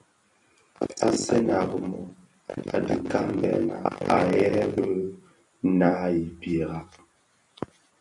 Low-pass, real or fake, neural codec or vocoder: 10.8 kHz; fake; vocoder, 44.1 kHz, 128 mel bands every 256 samples, BigVGAN v2